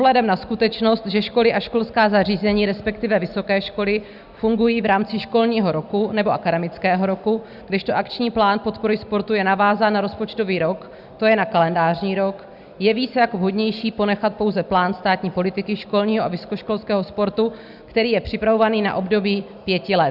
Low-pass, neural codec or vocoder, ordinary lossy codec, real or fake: 5.4 kHz; none; Opus, 64 kbps; real